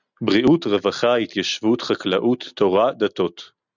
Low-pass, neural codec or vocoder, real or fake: 7.2 kHz; none; real